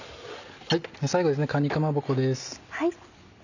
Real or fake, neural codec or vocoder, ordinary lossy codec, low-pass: real; none; none; 7.2 kHz